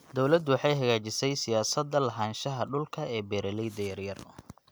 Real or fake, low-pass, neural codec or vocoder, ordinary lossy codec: real; none; none; none